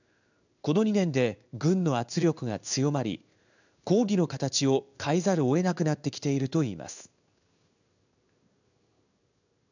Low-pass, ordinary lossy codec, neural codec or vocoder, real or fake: 7.2 kHz; none; codec, 16 kHz in and 24 kHz out, 1 kbps, XY-Tokenizer; fake